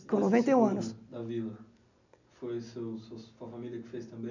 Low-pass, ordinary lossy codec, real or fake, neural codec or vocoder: 7.2 kHz; none; real; none